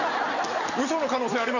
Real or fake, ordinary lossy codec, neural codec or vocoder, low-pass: real; none; none; 7.2 kHz